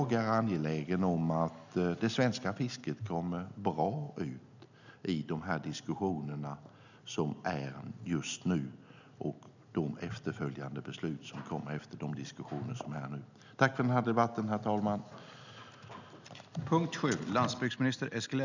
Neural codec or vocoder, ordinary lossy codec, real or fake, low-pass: none; none; real; 7.2 kHz